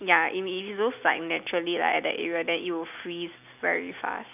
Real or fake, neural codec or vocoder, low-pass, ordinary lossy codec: real; none; 3.6 kHz; none